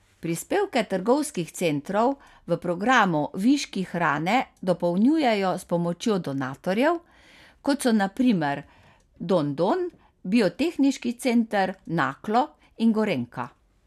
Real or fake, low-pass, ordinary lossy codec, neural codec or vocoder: real; 14.4 kHz; none; none